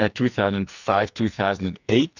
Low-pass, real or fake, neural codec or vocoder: 7.2 kHz; fake; codec, 44.1 kHz, 2.6 kbps, SNAC